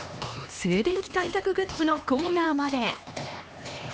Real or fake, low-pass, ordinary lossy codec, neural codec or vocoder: fake; none; none; codec, 16 kHz, 2 kbps, X-Codec, HuBERT features, trained on LibriSpeech